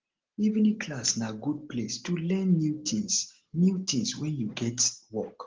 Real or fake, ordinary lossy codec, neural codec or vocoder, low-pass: real; Opus, 16 kbps; none; 7.2 kHz